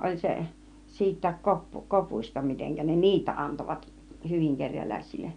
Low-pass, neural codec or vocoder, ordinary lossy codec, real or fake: 9.9 kHz; none; none; real